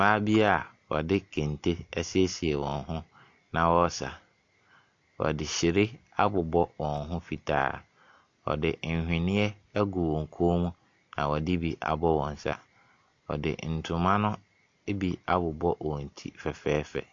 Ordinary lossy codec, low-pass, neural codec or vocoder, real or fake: AAC, 48 kbps; 7.2 kHz; none; real